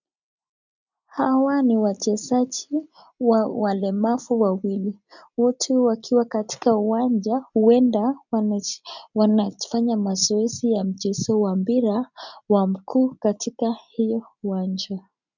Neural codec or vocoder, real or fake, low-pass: none; real; 7.2 kHz